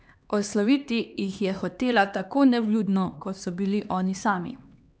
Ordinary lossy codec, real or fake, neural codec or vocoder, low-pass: none; fake; codec, 16 kHz, 2 kbps, X-Codec, HuBERT features, trained on LibriSpeech; none